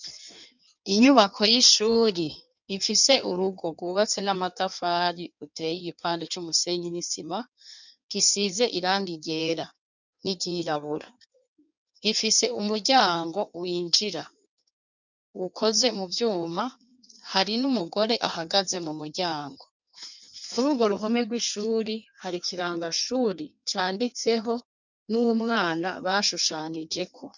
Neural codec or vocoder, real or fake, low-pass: codec, 16 kHz in and 24 kHz out, 1.1 kbps, FireRedTTS-2 codec; fake; 7.2 kHz